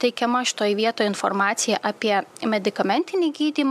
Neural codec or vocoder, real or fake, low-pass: none; real; 14.4 kHz